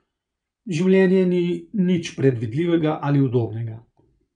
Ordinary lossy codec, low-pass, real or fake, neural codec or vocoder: none; 9.9 kHz; fake; vocoder, 22.05 kHz, 80 mel bands, Vocos